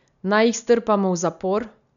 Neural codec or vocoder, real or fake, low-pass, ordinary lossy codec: none; real; 7.2 kHz; none